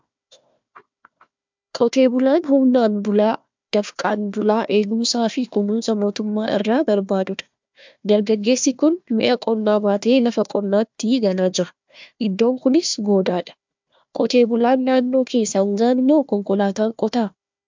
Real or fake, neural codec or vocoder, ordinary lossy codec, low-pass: fake; codec, 16 kHz, 1 kbps, FunCodec, trained on Chinese and English, 50 frames a second; MP3, 64 kbps; 7.2 kHz